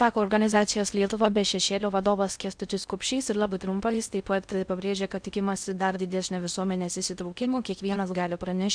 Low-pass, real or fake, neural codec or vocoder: 9.9 kHz; fake; codec, 16 kHz in and 24 kHz out, 0.6 kbps, FocalCodec, streaming, 4096 codes